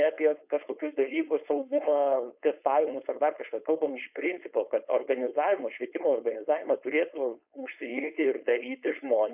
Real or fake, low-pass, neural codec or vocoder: fake; 3.6 kHz; codec, 16 kHz, 4.8 kbps, FACodec